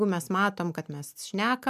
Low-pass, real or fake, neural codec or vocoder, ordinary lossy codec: 14.4 kHz; real; none; MP3, 96 kbps